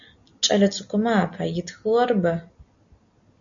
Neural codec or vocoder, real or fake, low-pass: none; real; 7.2 kHz